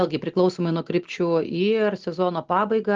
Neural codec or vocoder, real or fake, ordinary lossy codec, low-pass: none; real; Opus, 24 kbps; 7.2 kHz